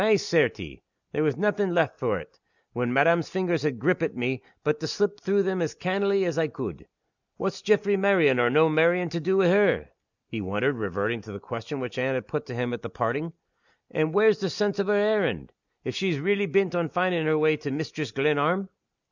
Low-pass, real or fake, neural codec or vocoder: 7.2 kHz; real; none